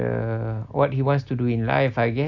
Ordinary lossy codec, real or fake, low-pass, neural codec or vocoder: none; real; 7.2 kHz; none